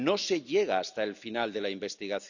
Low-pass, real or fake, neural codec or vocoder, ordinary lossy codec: 7.2 kHz; real; none; none